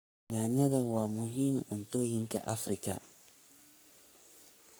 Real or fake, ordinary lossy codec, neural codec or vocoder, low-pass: fake; none; codec, 44.1 kHz, 3.4 kbps, Pupu-Codec; none